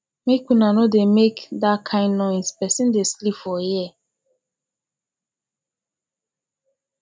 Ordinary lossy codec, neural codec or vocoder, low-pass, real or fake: none; none; none; real